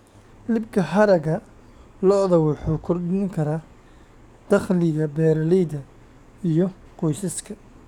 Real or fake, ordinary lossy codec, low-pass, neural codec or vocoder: fake; none; 19.8 kHz; codec, 44.1 kHz, 7.8 kbps, DAC